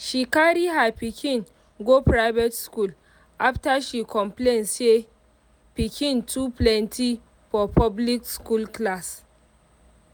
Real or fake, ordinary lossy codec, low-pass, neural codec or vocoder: real; none; none; none